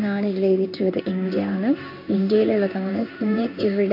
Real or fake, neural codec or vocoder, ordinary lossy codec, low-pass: fake; codec, 16 kHz in and 24 kHz out, 1 kbps, XY-Tokenizer; none; 5.4 kHz